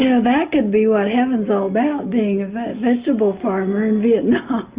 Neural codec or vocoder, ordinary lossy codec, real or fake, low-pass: none; Opus, 64 kbps; real; 3.6 kHz